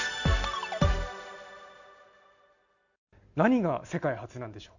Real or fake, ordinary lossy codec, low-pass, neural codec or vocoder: real; none; 7.2 kHz; none